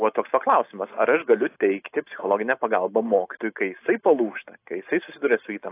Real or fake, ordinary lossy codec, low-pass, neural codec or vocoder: real; AAC, 24 kbps; 3.6 kHz; none